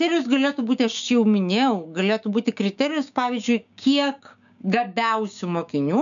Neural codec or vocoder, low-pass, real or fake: codec, 16 kHz, 6 kbps, DAC; 7.2 kHz; fake